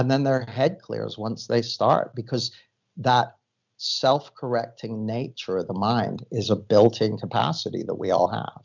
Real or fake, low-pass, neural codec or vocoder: real; 7.2 kHz; none